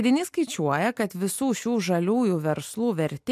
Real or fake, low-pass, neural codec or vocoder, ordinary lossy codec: real; 14.4 kHz; none; MP3, 96 kbps